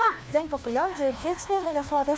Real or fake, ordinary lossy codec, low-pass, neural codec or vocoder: fake; none; none; codec, 16 kHz, 1 kbps, FunCodec, trained on LibriTTS, 50 frames a second